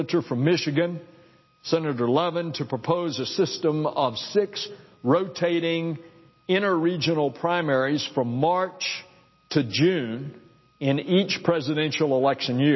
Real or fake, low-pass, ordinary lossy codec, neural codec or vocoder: real; 7.2 kHz; MP3, 24 kbps; none